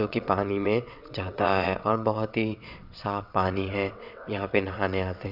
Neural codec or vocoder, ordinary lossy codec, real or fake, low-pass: vocoder, 22.05 kHz, 80 mel bands, WaveNeXt; none; fake; 5.4 kHz